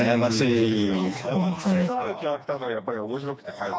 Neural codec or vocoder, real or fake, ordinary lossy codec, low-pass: codec, 16 kHz, 2 kbps, FreqCodec, smaller model; fake; none; none